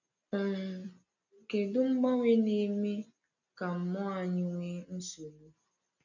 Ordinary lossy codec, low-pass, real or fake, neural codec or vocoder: none; 7.2 kHz; real; none